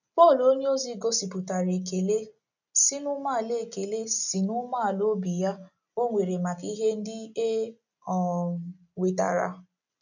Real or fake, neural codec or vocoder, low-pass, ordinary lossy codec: real; none; 7.2 kHz; none